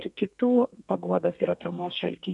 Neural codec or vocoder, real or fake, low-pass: codec, 32 kHz, 1.9 kbps, SNAC; fake; 10.8 kHz